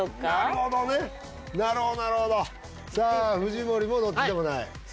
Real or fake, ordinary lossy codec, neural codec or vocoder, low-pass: real; none; none; none